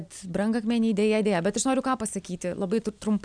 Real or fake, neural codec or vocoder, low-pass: real; none; 9.9 kHz